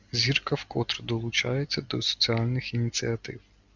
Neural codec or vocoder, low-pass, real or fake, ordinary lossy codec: none; 7.2 kHz; real; Opus, 64 kbps